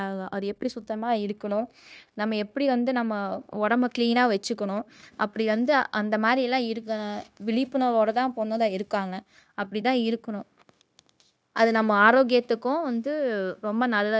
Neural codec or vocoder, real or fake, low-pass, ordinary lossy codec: codec, 16 kHz, 0.9 kbps, LongCat-Audio-Codec; fake; none; none